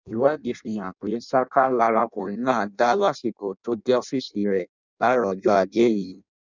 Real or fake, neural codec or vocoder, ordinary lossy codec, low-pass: fake; codec, 16 kHz in and 24 kHz out, 0.6 kbps, FireRedTTS-2 codec; none; 7.2 kHz